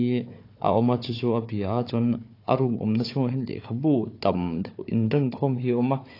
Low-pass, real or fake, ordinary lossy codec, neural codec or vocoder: 5.4 kHz; fake; AAC, 32 kbps; codec, 16 kHz, 4 kbps, FunCodec, trained on Chinese and English, 50 frames a second